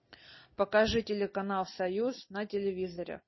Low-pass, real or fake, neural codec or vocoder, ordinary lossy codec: 7.2 kHz; real; none; MP3, 24 kbps